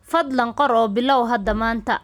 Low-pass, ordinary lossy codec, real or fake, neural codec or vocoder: 19.8 kHz; none; real; none